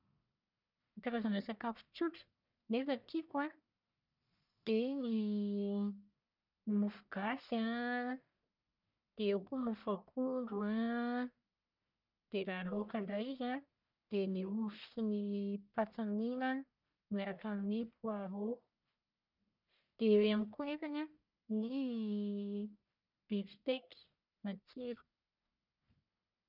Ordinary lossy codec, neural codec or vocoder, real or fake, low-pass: none; codec, 44.1 kHz, 1.7 kbps, Pupu-Codec; fake; 5.4 kHz